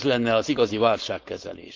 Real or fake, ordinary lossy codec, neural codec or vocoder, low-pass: fake; Opus, 32 kbps; codec, 16 kHz, 4.8 kbps, FACodec; 7.2 kHz